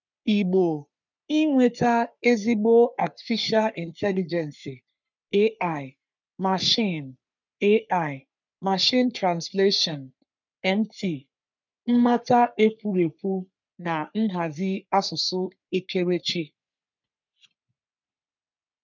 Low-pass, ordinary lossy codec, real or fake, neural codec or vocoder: 7.2 kHz; none; fake; codec, 44.1 kHz, 3.4 kbps, Pupu-Codec